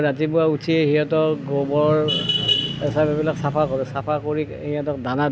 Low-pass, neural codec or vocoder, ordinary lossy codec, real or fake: none; none; none; real